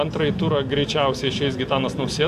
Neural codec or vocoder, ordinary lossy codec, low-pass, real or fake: none; MP3, 64 kbps; 14.4 kHz; real